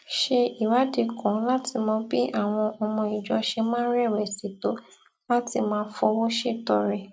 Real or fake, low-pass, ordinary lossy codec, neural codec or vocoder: real; none; none; none